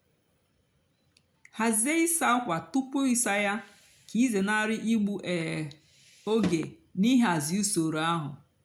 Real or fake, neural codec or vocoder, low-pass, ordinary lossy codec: real; none; 19.8 kHz; none